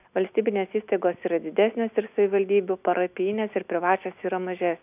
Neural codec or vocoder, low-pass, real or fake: none; 3.6 kHz; real